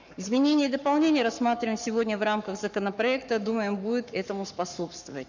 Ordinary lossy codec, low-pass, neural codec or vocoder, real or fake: none; 7.2 kHz; codec, 44.1 kHz, 7.8 kbps, Pupu-Codec; fake